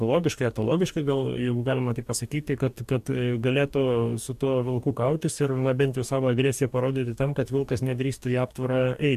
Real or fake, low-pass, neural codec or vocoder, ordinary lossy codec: fake; 14.4 kHz; codec, 44.1 kHz, 2.6 kbps, DAC; AAC, 96 kbps